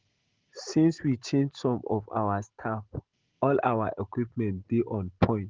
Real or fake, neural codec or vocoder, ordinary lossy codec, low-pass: real; none; Opus, 16 kbps; 7.2 kHz